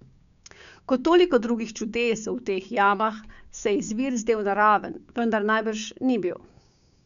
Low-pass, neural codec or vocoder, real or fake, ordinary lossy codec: 7.2 kHz; codec, 16 kHz, 6 kbps, DAC; fake; none